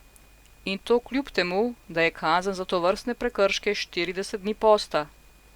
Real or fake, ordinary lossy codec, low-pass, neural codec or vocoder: real; Opus, 64 kbps; 19.8 kHz; none